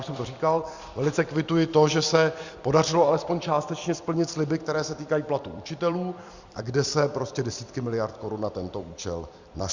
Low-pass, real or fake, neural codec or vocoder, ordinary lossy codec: 7.2 kHz; fake; vocoder, 44.1 kHz, 128 mel bands every 256 samples, BigVGAN v2; Opus, 64 kbps